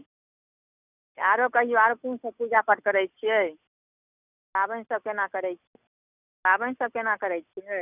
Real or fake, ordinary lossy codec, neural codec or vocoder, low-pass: real; none; none; 3.6 kHz